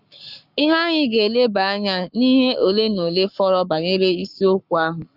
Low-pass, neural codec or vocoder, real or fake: 5.4 kHz; codec, 44.1 kHz, 7.8 kbps, Pupu-Codec; fake